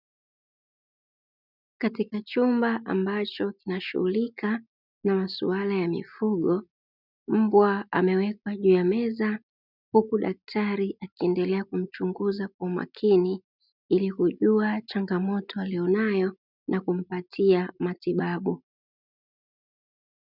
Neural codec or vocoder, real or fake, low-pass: none; real; 5.4 kHz